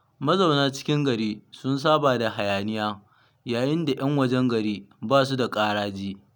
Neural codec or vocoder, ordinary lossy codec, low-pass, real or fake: none; none; none; real